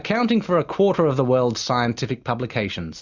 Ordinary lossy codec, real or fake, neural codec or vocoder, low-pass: Opus, 64 kbps; real; none; 7.2 kHz